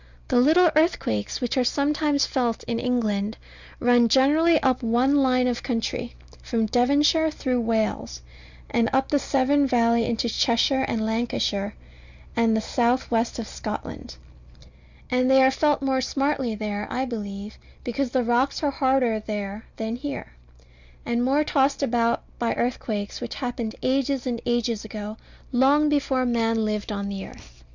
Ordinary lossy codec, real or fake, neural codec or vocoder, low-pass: Opus, 64 kbps; real; none; 7.2 kHz